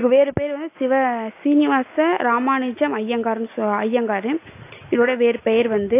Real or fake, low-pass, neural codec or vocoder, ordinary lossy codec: real; 3.6 kHz; none; AAC, 24 kbps